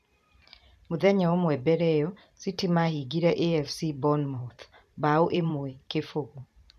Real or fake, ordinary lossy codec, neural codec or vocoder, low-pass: fake; none; vocoder, 44.1 kHz, 128 mel bands every 512 samples, BigVGAN v2; 14.4 kHz